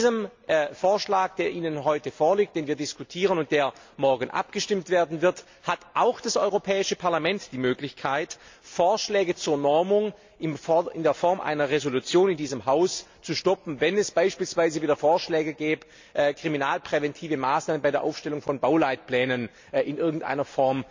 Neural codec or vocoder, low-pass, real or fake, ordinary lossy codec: none; 7.2 kHz; real; MP3, 64 kbps